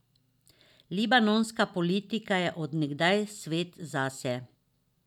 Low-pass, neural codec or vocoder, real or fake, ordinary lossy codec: 19.8 kHz; none; real; none